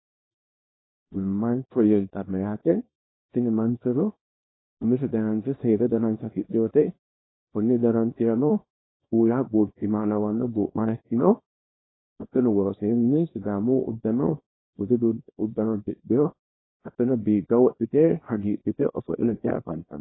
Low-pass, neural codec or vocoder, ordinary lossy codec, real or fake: 7.2 kHz; codec, 24 kHz, 0.9 kbps, WavTokenizer, small release; AAC, 16 kbps; fake